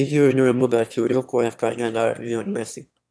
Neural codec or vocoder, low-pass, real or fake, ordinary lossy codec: autoencoder, 22.05 kHz, a latent of 192 numbers a frame, VITS, trained on one speaker; none; fake; none